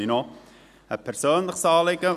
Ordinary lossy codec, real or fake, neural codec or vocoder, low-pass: none; real; none; 14.4 kHz